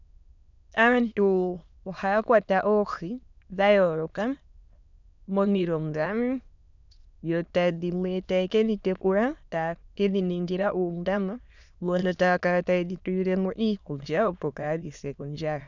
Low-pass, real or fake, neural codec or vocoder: 7.2 kHz; fake; autoencoder, 22.05 kHz, a latent of 192 numbers a frame, VITS, trained on many speakers